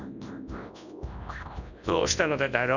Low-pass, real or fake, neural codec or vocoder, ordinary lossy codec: 7.2 kHz; fake; codec, 24 kHz, 0.9 kbps, WavTokenizer, large speech release; none